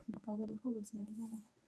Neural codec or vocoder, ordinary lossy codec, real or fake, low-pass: codec, 24 kHz, 0.9 kbps, WavTokenizer, medium speech release version 1; none; fake; none